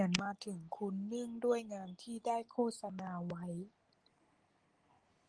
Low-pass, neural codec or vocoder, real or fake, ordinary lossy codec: 9.9 kHz; none; real; Opus, 24 kbps